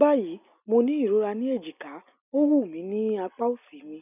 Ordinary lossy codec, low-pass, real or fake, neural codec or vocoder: none; 3.6 kHz; real; none